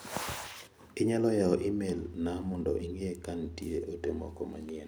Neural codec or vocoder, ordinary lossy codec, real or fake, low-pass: none; none; real; none